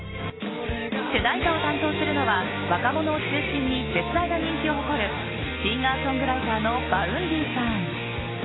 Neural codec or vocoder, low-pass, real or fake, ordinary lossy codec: none; 7.2 kHz; real; AAC, 16 kbps